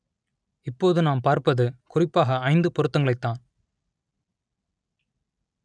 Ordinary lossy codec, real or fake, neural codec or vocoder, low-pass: none; real; none; 9.9 kHz